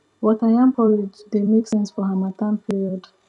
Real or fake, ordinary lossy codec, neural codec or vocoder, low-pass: fake; none; vocoder, 44.1 kHz, 128 mel bands every 256 samples, BigVGAN v2; 10.8 kHz